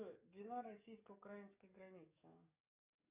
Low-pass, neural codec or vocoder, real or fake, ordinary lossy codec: 3.6 kHz; codec, 44.1 kHz, 7.8 kbps, DAC; fake; AAC, 32 kbps